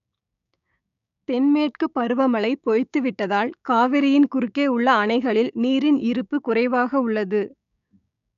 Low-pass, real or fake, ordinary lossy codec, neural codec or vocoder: 7.2 kHz; fake; none; codec, 16 kHz, 6 kbps, DAC